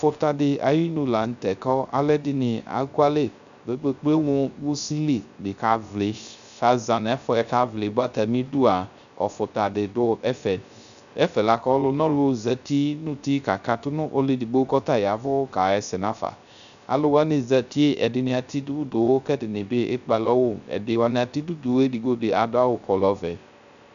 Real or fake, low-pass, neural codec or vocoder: fake; 7.2 kHz; codec, 16 kHz, 0.3 kbps, FocalCodec